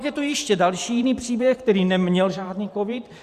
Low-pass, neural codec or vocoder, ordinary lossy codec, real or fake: 14.4 kHz; vocoder, 44.1 kHz, 128 mel bands every 512 samples, BigVGAN v2; Opus, 64 kbps; fake